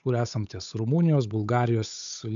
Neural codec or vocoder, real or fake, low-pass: codec, 16 kHz, 8 kbps, FunCodec, trained on Chinese and English, 25 frames a second; fake; 7.2 kHz